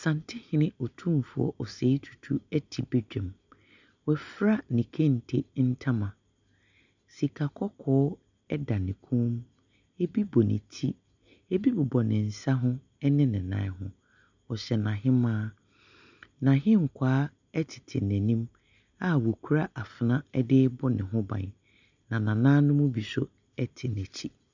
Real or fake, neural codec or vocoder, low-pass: real; none; 7.2 kHz